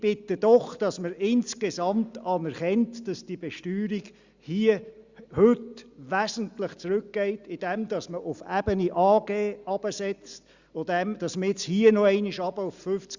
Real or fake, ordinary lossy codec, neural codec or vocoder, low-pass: real; Opus, 64 kbps; none; 7.2 kHz